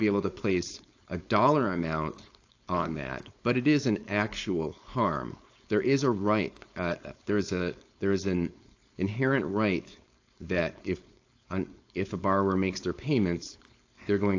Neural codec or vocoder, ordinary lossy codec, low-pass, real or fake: codec, 16 kHz, 4.8 kbps, FACodec; AAC, 48 kbps; 7.2 kHz; fake